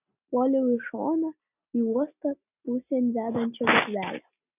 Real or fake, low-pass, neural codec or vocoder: real; 3.6 kHz; none